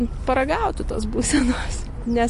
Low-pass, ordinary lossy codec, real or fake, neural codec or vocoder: 14.4 kHz; MP3, 48 kbps; real; none